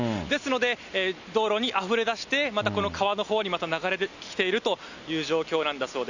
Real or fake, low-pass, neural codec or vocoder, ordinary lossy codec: real; 7.2 kHz; none; none